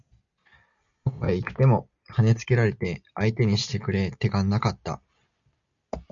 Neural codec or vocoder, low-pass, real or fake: none; 7.2 kHz; real